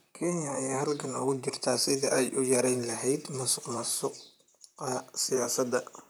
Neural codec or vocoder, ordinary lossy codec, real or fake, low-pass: vocoder, 44.1 kHz, 128 mel bands, Pupu-Vocoder; none; fake; none